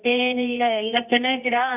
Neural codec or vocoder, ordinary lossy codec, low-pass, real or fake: codec, 24 kHz, 0.9 kbps, WavTokenizer, medium music audio release; none; 3.6 kHz; fake